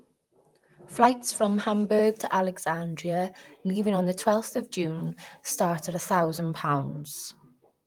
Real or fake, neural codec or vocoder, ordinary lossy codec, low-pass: fake; vocoder, 44.1 kHz, 128 mel bands, Pupu-Vocoder; Opus, 32 kbps; 19.8 kHz